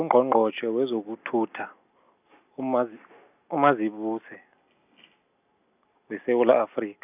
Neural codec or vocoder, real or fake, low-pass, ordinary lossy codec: none; real; 3.6 kHz; none